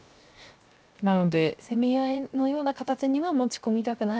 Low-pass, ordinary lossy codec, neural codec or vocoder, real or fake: none; none; codec, 16 kHz, 0.7 kbps, FocalCodec; fake